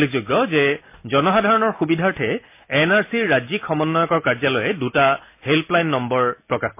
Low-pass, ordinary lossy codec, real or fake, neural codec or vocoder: 3.6 kHz; MP3, 24 kbps; real; none